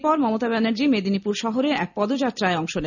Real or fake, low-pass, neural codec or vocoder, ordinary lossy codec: real; 7.2 kHz; none; none